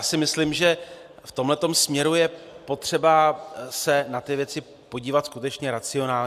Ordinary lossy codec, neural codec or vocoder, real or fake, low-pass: AAC, 96 kbps; none; real; 14.4 kHz